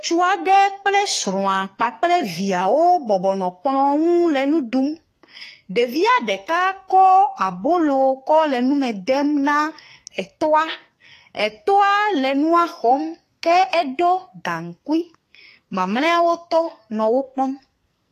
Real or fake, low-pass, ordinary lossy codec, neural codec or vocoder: fake; 14.4 kHz; AAC, 48 kbps; codec, 44.1 kHz, 2.6 kbps, SNAC